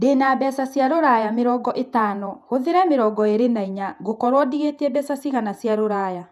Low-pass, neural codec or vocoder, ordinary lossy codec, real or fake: 14.4 kHz; vocoder, 48 kHz, 128 mel bands, Vocos; none; fake